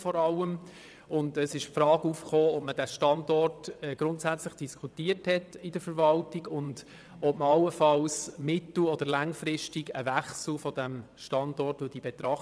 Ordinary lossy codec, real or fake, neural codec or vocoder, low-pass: none; fake; vocoder, 22.05 kHz, 80 mel bands, WaveNeXt; none